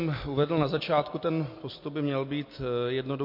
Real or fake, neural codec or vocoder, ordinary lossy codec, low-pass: real; none; MP3, 32 kbps; 5.4 kHz